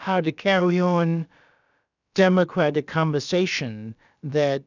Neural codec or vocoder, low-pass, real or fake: codec, 16 kHz, about 1 kbps, DyCAST, with the encoder's durations; 7.2 kHz; fake